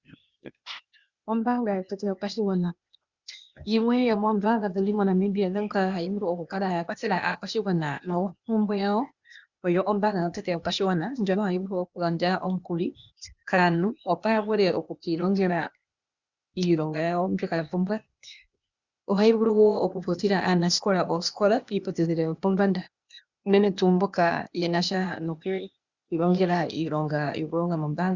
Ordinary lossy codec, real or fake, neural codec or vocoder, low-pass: Opus, 64 kbps; fake; codec, 16 kHz, 0.8 kbps, ZipCodec; 7.2 kHz